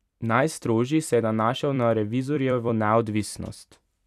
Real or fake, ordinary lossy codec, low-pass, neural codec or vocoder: fake; none; 14.4 kHz; vocoder, 44.1 kHz, 128 mel bands every 256 samples, BigVGAN v2